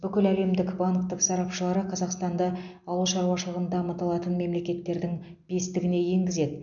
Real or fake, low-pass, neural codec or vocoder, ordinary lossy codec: real; 7.2 kHz; none; none